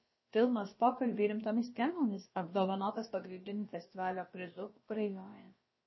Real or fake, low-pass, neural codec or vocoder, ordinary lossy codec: fake; 7.2 kHz; codec, 16 kHz, about 1 kbps, DyCAST, with the encoder's durations; MP3, 24 kbps